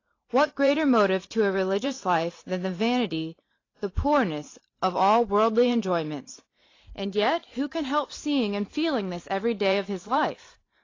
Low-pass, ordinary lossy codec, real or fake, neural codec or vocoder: 7.2 kHz; AAC, 32 kbps; fake; vocoder, 44.1 kHz, 128 mel bands every 512 samples, BigVGAN v2